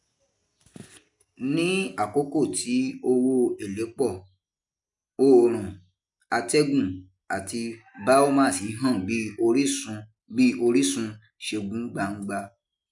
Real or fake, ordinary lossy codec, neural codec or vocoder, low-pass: real; MP3, 96 kbps; none; 10.8 kHz